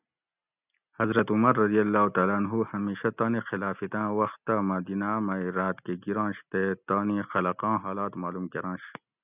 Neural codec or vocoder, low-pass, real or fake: none; 3.6 kHz; real